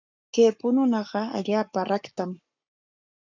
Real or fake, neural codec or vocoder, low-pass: fake; codec, 44.1 kHz, 7.8 kbps, Pupu-Codec; 7.2 kHz